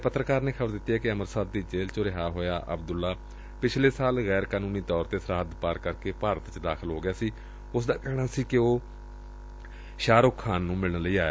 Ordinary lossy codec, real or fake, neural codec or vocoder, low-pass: none; real; none; none